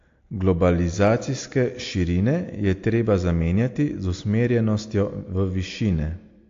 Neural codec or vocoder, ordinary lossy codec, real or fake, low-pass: none; AAC, 48 kbps; real; 7.2 kHz